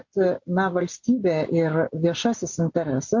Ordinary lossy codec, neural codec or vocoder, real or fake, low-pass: MP3, 48 kbps; none; real; 7.2 kHz